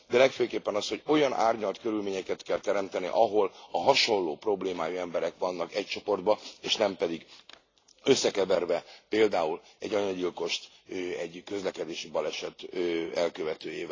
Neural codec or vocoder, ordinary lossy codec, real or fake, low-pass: none; AAC, 32 kbps; real; 7.2 kHz